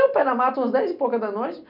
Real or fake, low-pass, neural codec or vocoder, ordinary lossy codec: real; 5.4 kHz; none; none